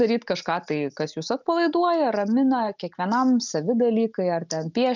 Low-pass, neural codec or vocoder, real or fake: 7.2 kHz; none; real